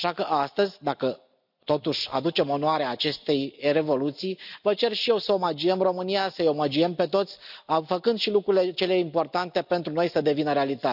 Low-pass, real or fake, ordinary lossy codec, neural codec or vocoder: 5.4 kHz; real; none; none